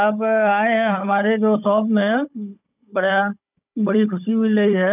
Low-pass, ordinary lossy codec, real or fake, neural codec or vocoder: 3.6 kHz; none; fake; codec, 16 kHz, 16 kbps, FunCodec, trained on Chinese and English, 50 frames a second